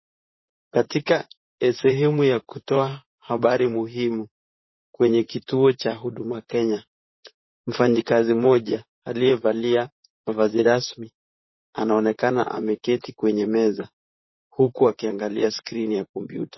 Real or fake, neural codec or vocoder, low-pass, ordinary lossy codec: fake; vocoder, 44.1 kHz, 128 mel bands, Pupu-Vocoder; 7.2 kHz; MP3, 24 kbps